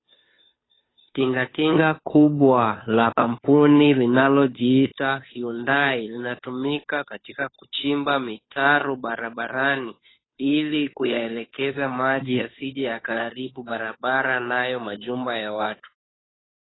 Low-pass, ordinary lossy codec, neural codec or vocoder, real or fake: 7.2 kHz; AAC, 16 kbps; codec, 16 kHz, 2 kbps, FunCodec, trained on Chinese and English, 25 frames a second; fake